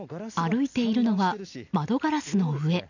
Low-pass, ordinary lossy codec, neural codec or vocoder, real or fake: 7.2 kHz; none; none; real